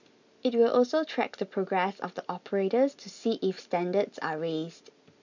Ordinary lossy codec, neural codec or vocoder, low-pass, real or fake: none; none; 7.2 kHz; real